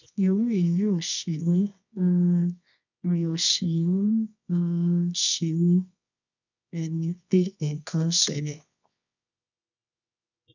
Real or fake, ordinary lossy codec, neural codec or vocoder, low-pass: fake; none; codec, 24 kHz, 0.9 kbps, WavTokenizer, medium music audio release; 7.2 kHz